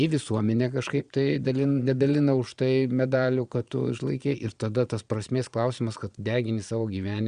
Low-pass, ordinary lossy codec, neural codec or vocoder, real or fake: 9.9 kHz; Opus, 64 kbps; vocoder, 22.05 kHz, 80 mel bands, Vocos; fake